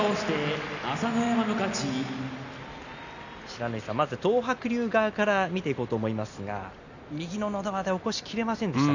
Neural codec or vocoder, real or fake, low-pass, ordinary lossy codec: none; real; 7.2 kHz; none